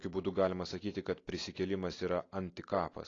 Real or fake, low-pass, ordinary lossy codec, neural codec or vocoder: real; 7.2 kHz; AAC, 32 kbps; none